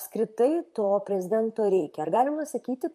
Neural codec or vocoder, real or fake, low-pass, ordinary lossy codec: vocoder, 44.1 kHz, 128 mel bands, Pupu-Vocoder; fake; 14.4 kHz; MP3, 64 kbps